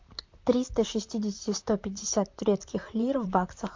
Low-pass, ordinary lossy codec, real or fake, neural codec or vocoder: 7.2 kHz; MP3, 48 kbps; fake; vocoder, 44.1 kHz, 128 mel bands, Pupu-Vocoder